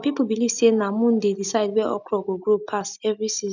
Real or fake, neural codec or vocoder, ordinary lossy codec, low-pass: real; none; none; 7.2 kHz